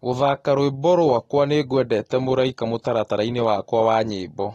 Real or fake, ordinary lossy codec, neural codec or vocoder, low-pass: real; AAC, 32 kbps; none; 19.8 kHz